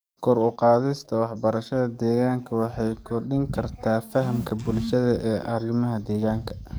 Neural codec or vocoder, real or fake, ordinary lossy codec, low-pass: codec, 44.1 kHz, 7.8 kbps, DAC; fake; none; none